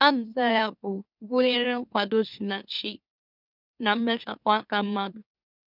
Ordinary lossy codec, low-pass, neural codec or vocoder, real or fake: none; 5.4 kHz; autoencoder, 44.1 kHz, a latent of 192 numbers a frame, MeloTTS; fake